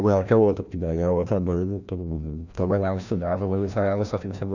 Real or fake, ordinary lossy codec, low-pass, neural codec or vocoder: fake; none; 7.2 kHz; codec, 16 kHz, 1 kbps, FreqCodec, larger model